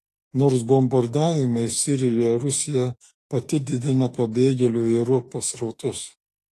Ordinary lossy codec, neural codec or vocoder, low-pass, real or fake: AAC, 48 kbps; autoencoder, 48 kHz, 32 numbers a frame, DAC-VAE, trained on Japanese speech; 14.4 kHz; fake